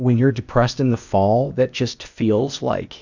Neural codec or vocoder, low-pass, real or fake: codec, 16 kHz, 0.8 kbps, ZipCodec; 7.2 kHz; fake